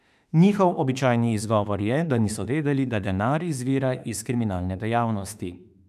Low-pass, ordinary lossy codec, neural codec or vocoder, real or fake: 14.4 kHz; none; autoencoder, 48 kHz, 32 numbers a frame, DAC-VAE, trained on Japanese speech; fake